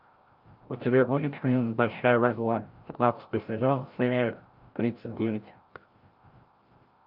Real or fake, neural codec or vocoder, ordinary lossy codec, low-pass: fake; codec, 16 kHz, 0.5 kbps, FreqCodec, larger model; Opus, 24 kbps; 5.4 kHz